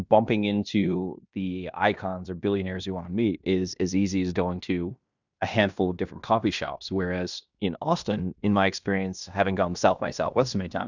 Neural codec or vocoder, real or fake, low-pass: codec, 16 kHz in and 24 kHz out, 0.9 kbps, LongCat-Audio-Codec, fine tuned four codebook decoder; fake; 7.2 kHz